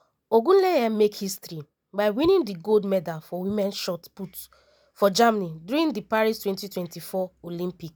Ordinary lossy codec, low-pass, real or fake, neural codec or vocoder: none; none; real; none